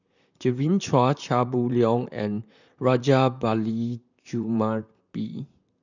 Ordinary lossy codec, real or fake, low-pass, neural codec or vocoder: none; fake; 7.2 kHz; vocoder, 44.1 kHz, 128 mel bands, Pupu-Vocoder